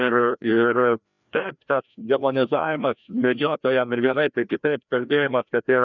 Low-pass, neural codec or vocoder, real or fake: 7.2 kHz; codec, 16 kHz, 1 kbps, FreqCodec, larger model; fake